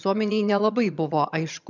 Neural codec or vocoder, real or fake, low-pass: vocoder, 22.05 kHz, 80 mel bands, HiFi-GAN; fake; 7.2 kHz